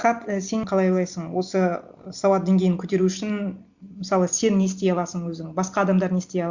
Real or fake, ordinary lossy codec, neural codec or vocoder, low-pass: real; Opus, 64 kbps; none; 7.2 kHz